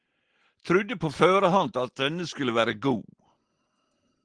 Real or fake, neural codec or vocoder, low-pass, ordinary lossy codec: real; none; 9.9 kHz; Opus, 16 kbps